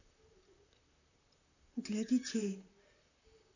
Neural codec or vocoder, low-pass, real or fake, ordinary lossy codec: vocoder, 44.1 kHz, 128 mel bands, Pupu-Vocoder; 7.2 kHz; fake; none